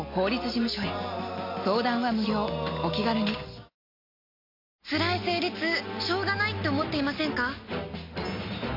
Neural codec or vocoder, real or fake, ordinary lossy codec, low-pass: none; real; MP3, 32 kbps; 5.4 kHz